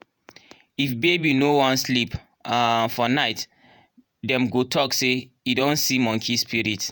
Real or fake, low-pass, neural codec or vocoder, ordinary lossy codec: fake; none; vocoder, 48 kHz, 128 mel bands, Vocos; none